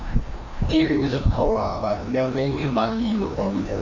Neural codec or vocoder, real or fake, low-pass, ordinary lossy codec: codec, 16 kHz, 1 kbps, FreqCodec, larger model; fake; 7.2 kHz; none